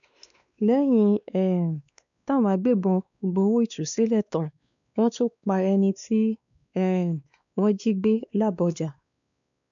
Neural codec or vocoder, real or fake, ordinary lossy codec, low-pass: codec, 16 kHz, 2 kbps, X-Codec, WavLM features, trained on Multilingual LibriSpeech; fake; MP3, 96 kbps; 7.2 kHz